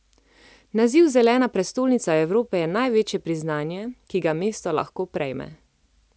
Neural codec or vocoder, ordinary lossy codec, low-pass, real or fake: none; none; none; real